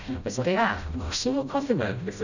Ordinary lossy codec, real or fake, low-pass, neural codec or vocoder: none; fake; 7.2 kHz; codec, 16 kHz, 0.5 kbps, FreqCodec, smaller model